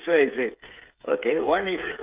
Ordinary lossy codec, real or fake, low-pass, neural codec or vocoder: Opus, 32 kbps; fake; 3.6 kHz; codec, 16 kHz, 8 kbps, FreqCodec, smaller model